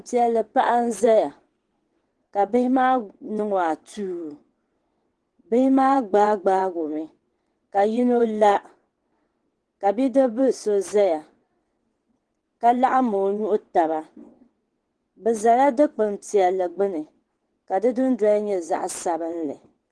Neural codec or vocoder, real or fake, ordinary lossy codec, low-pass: vocoder, 22.05 kHz, 80 mel bands, WaveNeXt; fake; Opus, 16 kbps; 9.9 kHz